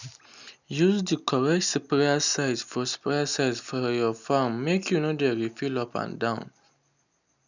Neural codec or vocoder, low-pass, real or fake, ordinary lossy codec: none; 7.2 kHz; real; none